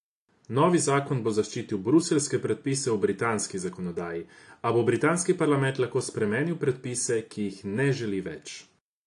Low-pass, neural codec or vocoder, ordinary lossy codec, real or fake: 10.8 kHz; none; AAC, 64 kbps; real